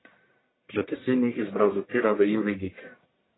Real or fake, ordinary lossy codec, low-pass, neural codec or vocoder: fake; AAC, 16 kbps; 7.2 kHz; codec, 44.1 kHz, 1.7 kbps, Pupu-Codec